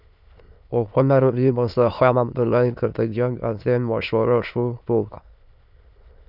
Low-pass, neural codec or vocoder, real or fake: 5.4 kHz; autoencoder, 22.05 kHz, a latent of 192 numbers a frame, VITS, trained on many speakers; fake